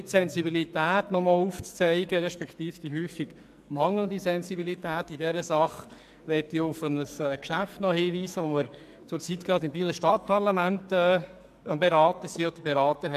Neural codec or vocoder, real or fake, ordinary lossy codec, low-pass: codec, 44.1 kHz, 2.6 kbps, SNAC; fake; MP3, 96 kbps; 14.4 kHz